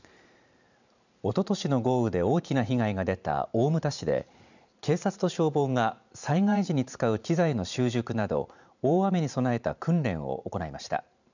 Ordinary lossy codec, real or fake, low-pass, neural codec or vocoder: none; fake; 7.2 kHz; vocoder, 44.1 kHz, 128 mel bands every 512 samples, BigVGAN v2